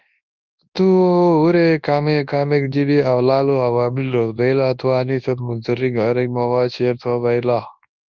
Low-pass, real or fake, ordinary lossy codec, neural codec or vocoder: 7.2 kHz; fake; Opus, 32 kbps; codec, 24 kHz, 0.9 kbps, WavTokenizer, large speech release